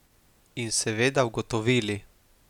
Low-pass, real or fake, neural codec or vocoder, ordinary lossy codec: 19.8 kHz; real; none; none